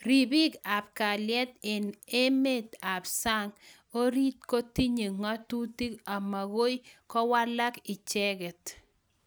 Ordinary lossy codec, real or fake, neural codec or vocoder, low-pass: none; real; none; none